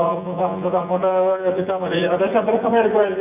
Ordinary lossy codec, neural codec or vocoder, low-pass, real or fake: none; vocoder, 24 kHz, 100 mel bands, Vocos; 3.6 kHz; fake